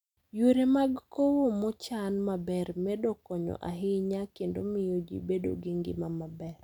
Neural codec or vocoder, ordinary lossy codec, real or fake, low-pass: none; none; real; 19.8 kHz